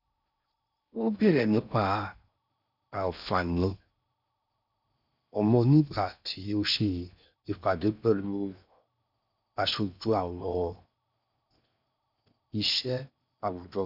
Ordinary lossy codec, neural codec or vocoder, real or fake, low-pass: none; codec, 16 kHz in and 24 kHz out, 0.6 kbps, FocalCodec, streaming, 4096 codes; fake; 5.4 kHz